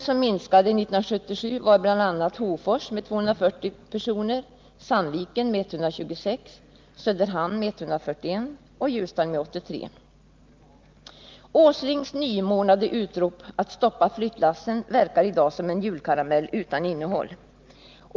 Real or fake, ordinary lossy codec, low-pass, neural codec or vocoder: real; Opus, 24 kbps; 7.2 kHz; none